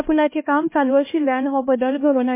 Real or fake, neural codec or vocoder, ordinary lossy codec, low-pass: fake; codec, 16 kHz, 1 kbps, X-Codec, HuBERT features, trained on LibriSpeech; MP3, 24 kbps; 3.6 kHz